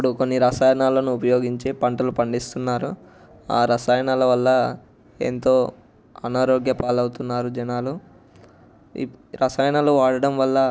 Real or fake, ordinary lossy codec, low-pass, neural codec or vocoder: real; none; none; none